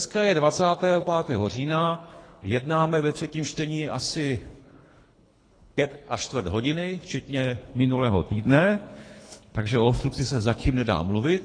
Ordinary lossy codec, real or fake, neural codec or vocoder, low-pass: AAC, 32 kbps; fake; codec, 24 kHz, 3 kbps, HILCodec; 9.9 kHz